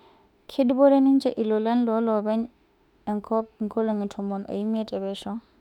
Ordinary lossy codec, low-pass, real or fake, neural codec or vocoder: none; 19.8 kHz; fake; autoencoder, 48 kHz, 32 numbers a frame, DAC-VAE, trained on Japanese speech